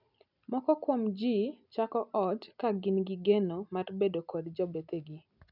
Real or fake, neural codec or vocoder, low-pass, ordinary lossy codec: real; none; 5.4 kHz; none